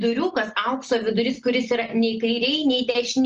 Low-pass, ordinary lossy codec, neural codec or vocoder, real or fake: 7.2 kHz; Opus, 24 kbps; none; real